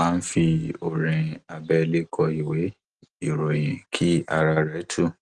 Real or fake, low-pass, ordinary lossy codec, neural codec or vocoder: real; 10.8 kHz; Opus, 24 kbps; none